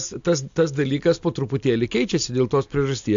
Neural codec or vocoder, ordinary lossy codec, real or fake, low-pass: none; AAC, 64 kbps; real; 7.2 kHz